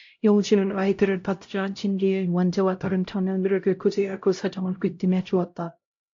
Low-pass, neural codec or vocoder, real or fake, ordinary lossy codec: 7.2 kHz; codec, 16 kHz, 0.5 kbps, X-Codec, HuBERT features, trained on LibriSpeech; fake; AAC, 48 kbps